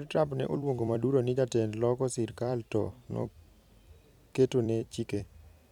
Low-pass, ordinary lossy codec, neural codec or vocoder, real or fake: 19.8 kHz; none; vocoder, 44.1 kHz, 128 mel bands every 256 samples, BigVGAN v2; fake